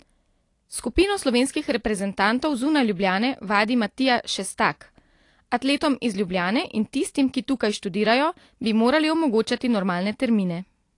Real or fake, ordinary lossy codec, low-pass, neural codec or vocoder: real; AAC, 48 kbps; 10.8 kHz; none